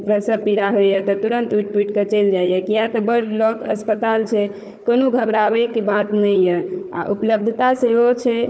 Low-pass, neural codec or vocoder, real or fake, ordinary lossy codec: none; codec, 16 kHz, 4 kbps, FunCodec, trained on Chinese and English, 50 frames a second; fake; none